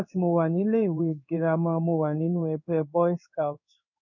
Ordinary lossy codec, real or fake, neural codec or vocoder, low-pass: none; fake; codec, 16 kHz in and 24 kHz out, 1 kbps, XY-Tokenizer; 7.2 kHz